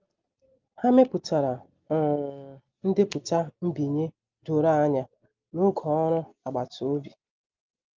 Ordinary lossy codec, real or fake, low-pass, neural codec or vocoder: Opus, 24 kbps; real; 7.2 kHz; none